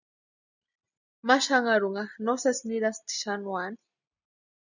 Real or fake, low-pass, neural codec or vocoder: real; 7.2 kHz; none